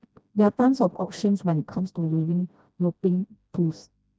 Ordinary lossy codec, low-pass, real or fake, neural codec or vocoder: none; none; fake; codec, 16 kHz, 1 kbps, FreqCodec, smaller model